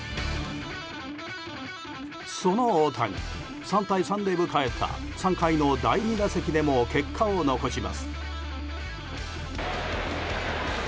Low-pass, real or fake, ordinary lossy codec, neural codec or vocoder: none; real; none; none